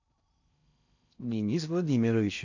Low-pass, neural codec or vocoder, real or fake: 7.2 kHz; codec, 16 kHz in and 24 kHz out, 0.6 kbps, FocalCodec, streaming, 4096 codes; fake